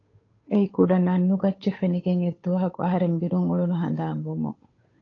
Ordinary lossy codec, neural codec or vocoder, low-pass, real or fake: AAC, 32 kbps; codec, 16 kHz, 8 kbps, FunCodec, trained on Chinese and English, 25 frames a second; 7.2 kHz; fake